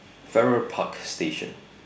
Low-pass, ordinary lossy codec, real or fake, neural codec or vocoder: none; none; real; none